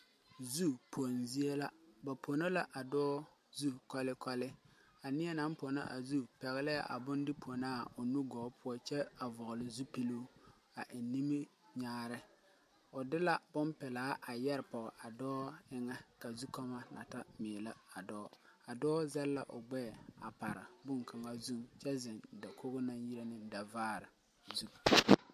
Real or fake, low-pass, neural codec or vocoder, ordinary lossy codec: real; 14.4 kHz; none; MP3, 64 kbps